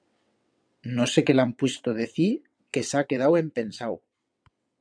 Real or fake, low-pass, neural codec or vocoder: fake; 9.9 kHz; vocoder, 22.05 kHz, 80 mel bands, WaveNeXt